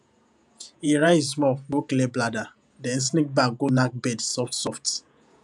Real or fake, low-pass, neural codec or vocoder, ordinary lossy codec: fake; 10.8 kHz; vocoder, 48 kHz, 128 mel bands, Vocos; none